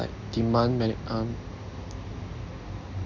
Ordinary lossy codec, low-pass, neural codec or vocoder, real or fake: none; 7.2 kHz; none; real